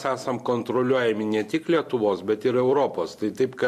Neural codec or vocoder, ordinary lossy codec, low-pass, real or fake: none; MP3, 64 kbps; 14.4 kHz; real